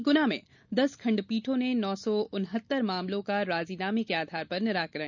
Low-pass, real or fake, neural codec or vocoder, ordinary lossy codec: 7.2 kHz; real; none; none